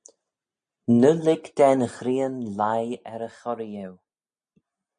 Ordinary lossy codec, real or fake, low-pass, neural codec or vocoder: MP3, 96 kbps; real; 9.9 kHz; none